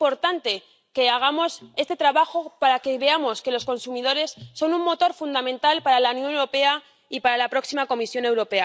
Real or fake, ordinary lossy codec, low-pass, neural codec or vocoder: real; none; none; none